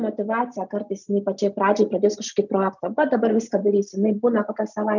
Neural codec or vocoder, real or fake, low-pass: none; real; 7.2 kHz